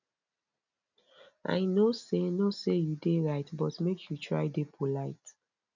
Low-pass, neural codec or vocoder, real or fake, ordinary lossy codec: 7.2 kHz; none; real; none